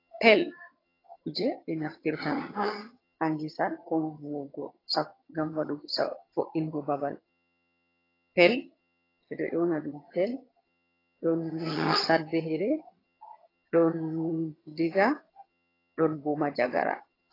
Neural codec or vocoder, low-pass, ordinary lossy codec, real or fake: vocoder, 22.05 kHz, 80 mel bands, HiFi-GAN; 5.4 kHz; AAC, 24 kbps; fake